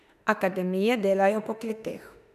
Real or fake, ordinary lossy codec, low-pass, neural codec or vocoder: fake; none; 14.4 kHz; autoencoder, 48 kHz, 32 numbers a frame, DAC-VAE, trained on Japanese speech